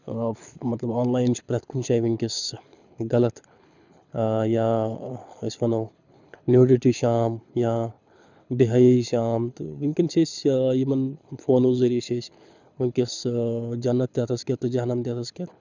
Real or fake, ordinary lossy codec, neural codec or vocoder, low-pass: fake; none; codec, 24 kHz, 6 kbps, HILCodec; 7.2 kHz